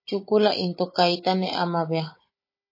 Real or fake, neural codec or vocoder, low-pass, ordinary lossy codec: fake; codec, 16 kHz, 16 kbps, FunCodec, trained on Chinese and English, 50 frames a second; 5.4 kHz; MP3, 24 kbps